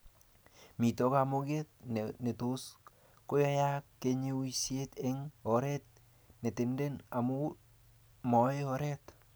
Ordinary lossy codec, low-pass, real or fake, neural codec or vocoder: none; none; real; none